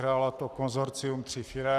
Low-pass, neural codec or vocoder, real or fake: 14.4 kHz; codec, 44.1 kHz, 7.8 kbps, Pupu-Codec; fake